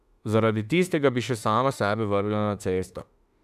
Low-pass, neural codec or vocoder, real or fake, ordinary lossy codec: 14.4 kHz; autoencoder, 48 kHz, 32 numbers a frame, DAC-VAE, trained on Japanese speech; fake; none